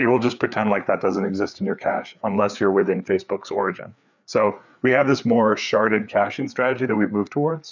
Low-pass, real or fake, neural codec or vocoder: 7.2 kHz; fake; codec, 16 kHz, 4 kbps, FreqCodec, larger model